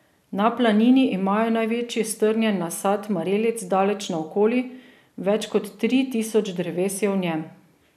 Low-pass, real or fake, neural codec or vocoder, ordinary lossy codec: 14.4 kHz; real; none; none